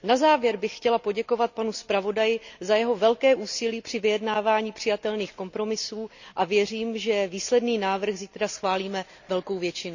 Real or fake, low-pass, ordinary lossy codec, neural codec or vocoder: real; 7.2 kHz; none; none